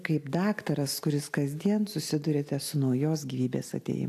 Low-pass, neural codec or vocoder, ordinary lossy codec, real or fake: 14.4 kHz; none; AAC, 64 kbps; real